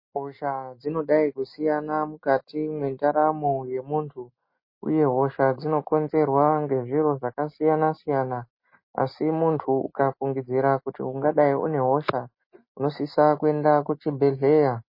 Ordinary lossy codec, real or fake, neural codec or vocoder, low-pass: MP3, 24 kbps; real; none; 5.4 kHz